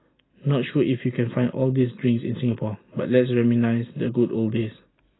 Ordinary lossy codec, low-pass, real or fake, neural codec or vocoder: AAC, 16 kbps; 7.2 kHz; real; none